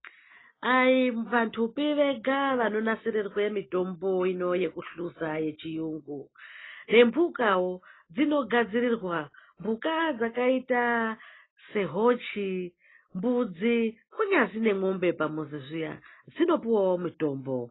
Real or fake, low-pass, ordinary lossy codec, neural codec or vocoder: real; 7.2 kHz; AAC, 16 kbps; none